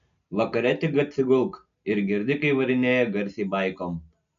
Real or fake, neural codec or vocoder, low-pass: real; none; 7.2 kHz